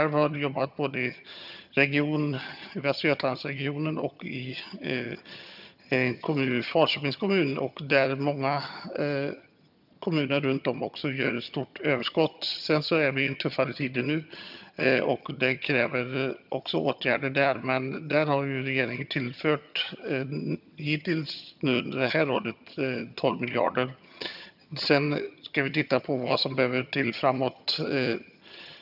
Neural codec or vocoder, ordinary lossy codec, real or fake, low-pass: vocoder, 22.05 kHz, 80 mel bands, HiFi-GAN; none; fake; 5.4 kHz